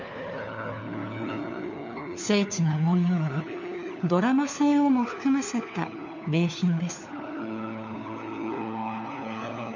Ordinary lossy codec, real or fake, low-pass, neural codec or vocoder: none; fake; 7.2 kHz; codec, 16 kHz, 4 kbps, FunCodec, trained on LibriTTS, 50 frames a second